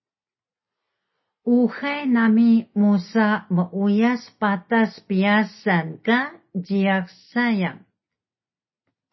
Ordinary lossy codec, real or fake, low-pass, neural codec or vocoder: MP3, 24 kbps; real; 7.2 kHz; none